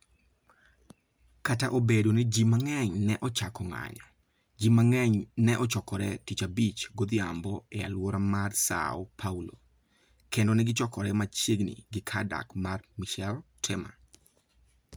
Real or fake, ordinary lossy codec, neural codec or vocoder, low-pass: real; none; none; none